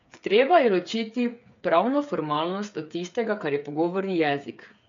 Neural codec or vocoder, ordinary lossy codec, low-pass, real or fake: codec, 16 kHz, 8 kbps, FreqCodec, smaller model; MP3, 64 kbps; 7.2 kHz; fake